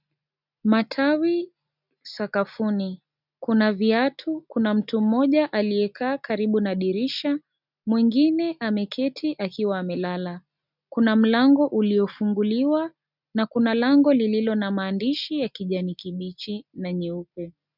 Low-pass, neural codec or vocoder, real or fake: 5.4 kHz; none; real